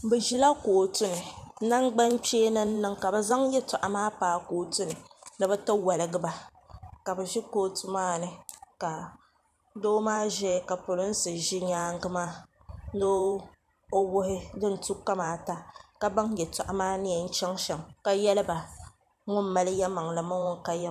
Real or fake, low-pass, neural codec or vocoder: fake; 14.4 kHz; vocoder, 44.1 kHz, 128 mel bands every 512 samples, BigVGAN v2